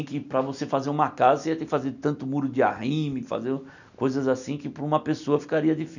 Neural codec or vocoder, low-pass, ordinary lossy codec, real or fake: none; 7.2 kHz; none; real